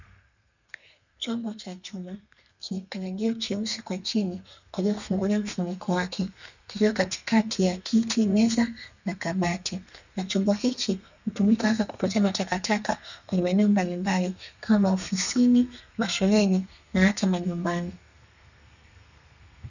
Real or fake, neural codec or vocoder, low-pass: fake; codec, 32 kHz, 1.9 kbps, SNAC; 7.2 kHz